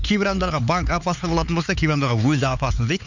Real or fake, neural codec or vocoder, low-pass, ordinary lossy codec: fake; codec, 16 kHz, 4 kbps, X-Codec, HuBERT features, trained on LibriSpeech; 7.2 kHz; none